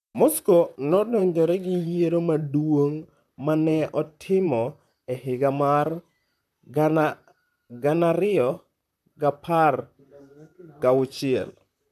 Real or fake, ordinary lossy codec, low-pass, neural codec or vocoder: fake; none; 14.4 kHz; vocoder, 44.1 kHz, 128 mel bands, Pupu-Vocoder